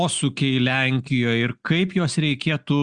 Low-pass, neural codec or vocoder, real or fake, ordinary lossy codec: 9.9 kHz; none; real; Opus, 64 kbps